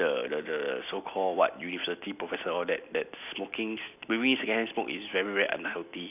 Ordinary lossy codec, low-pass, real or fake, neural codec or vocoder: none; 3.6 kHz; real; none